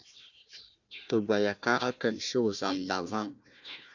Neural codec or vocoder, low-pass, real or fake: codec, 16 kHz, 1 kbps, FunCodec, trained on Chinese and English, 50 frames a second; 7.2 kHz; fake